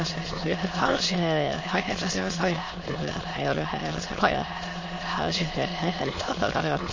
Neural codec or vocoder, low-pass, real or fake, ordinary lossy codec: autoencoder, 22.05 kHz, a latent of 192 numbers a frame, VITS, trained on many speakers; 7.2 kHz; fake; MP3, 32 kbps